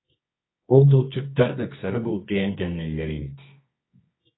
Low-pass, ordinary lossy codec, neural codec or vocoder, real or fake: 7.2 kHz; AAC, 16 kbps; codec, 24 kHz, 0.9 kbps, WavTokenizer, medium music audio release; fake